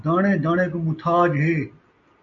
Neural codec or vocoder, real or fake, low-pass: none; real; 7.2 kHz